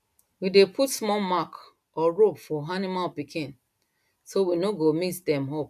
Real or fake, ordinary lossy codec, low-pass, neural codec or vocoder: real; none; 14.4 kHz; none